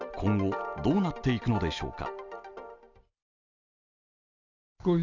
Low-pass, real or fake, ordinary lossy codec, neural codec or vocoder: 7.2 kHz; real; none; none